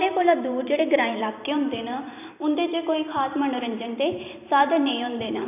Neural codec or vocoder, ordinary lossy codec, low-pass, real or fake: vocoder, 44.1 kHz, 128 mel bands every 512 samples, BigVGAN v2; none; 3.6 kHz; fake